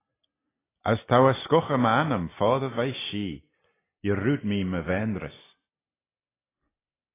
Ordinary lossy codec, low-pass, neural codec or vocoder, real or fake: AAC, 16 kbps; 3.6 kHz; none; real